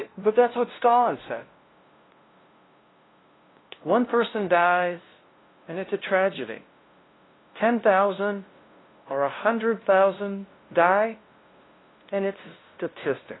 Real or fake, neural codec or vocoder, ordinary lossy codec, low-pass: fake; codec, 16 kHz, 0.5 kbps, FunCodec, trained on LibriTTS, 25 frames a second; AAC, 16 kbps; 7.2 kHz